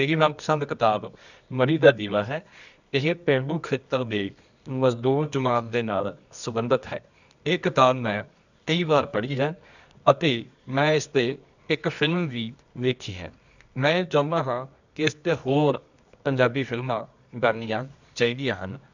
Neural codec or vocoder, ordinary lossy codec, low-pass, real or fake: codec, 24 kHz, 0.9 kbps, WavTokenizer, medium music audio release; none; 7.2 kHz; fake